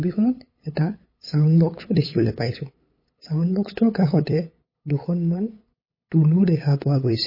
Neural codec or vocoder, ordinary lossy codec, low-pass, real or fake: codec, 16 kHz, 8 kbps, FunCodec, trained on LibriTTS, 25 frames a second; MP3, 24 kbps; 5.4 kHz; fake